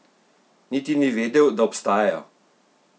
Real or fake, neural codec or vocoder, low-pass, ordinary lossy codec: real; none; none; none